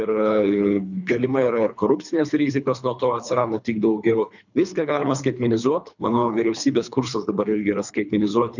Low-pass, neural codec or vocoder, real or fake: 7.2 kHz; codec, 24 kHz, 3 kbps, HILCodec; fake